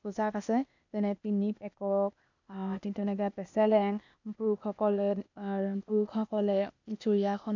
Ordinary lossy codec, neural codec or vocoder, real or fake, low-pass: none; codec, 16 kHz, 0.8 kbps, ZipCodec; fake; 7.2 kHz